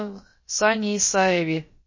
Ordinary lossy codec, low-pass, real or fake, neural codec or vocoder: MP3, 32 kbps; 7.2 kHz; fake; codec, 16 kHz, about 1 kbps, DyCAST, with the encoder's durations